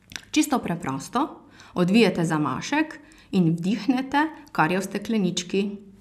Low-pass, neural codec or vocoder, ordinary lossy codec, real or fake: 14.4 kHz; none; none; real